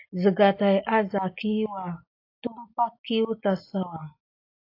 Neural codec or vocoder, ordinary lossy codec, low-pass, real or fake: none; AAC, 32 kbps; 5.4 kHz; real